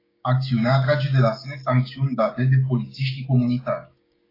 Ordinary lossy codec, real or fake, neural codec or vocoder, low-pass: AAC, 24 kbps; fake; codec, 16 kHz, 6 kbps, DAC; 5.4 kHz